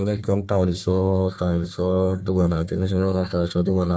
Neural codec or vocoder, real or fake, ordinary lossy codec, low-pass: codec, 16 kHz, 1 kbps, FunCodec, trained on Chinese and English, 50 frames a second; fake; none; none